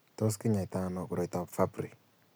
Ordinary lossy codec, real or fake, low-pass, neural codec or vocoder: none; real; none; none